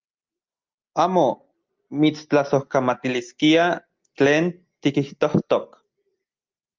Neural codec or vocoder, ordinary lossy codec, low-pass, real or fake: none; Opus, 32 kbps; 7.2 kHz; real